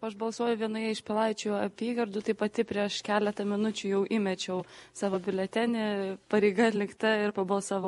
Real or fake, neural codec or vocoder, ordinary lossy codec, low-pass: real; none; MP3, 48 kbps; 14.4 kHz